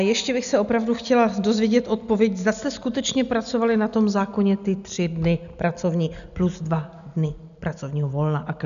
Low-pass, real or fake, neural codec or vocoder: 7.2 kHz; real; none